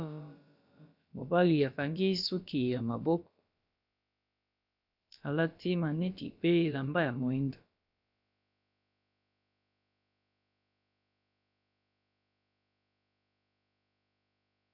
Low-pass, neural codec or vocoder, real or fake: 5.4 kHz; codec, 16 kHz, about 1 kbps, DyCAST, with the encoder's durations; fake